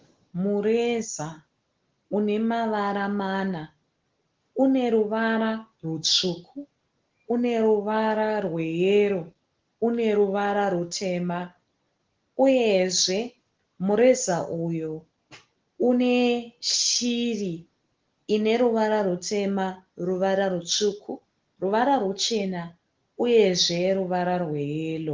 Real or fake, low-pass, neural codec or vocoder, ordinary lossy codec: real; 7.2 kHz; none; Opus, 16 kbps